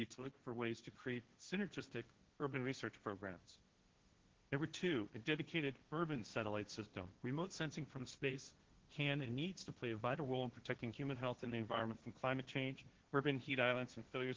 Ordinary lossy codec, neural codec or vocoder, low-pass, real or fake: Opus, 16 kbps; codec, 16 kHz, 1.1 kbps, Voila-Tokenizer; 7.2 kHz; fake